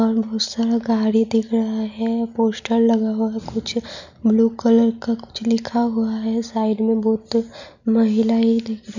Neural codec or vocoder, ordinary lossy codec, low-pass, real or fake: none; none; 7.2 kHz; real